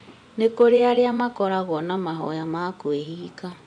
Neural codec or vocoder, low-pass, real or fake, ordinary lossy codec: vocoder, 24 kHz, 100 mel bands, Vocos; 9.9 kHz; fake; none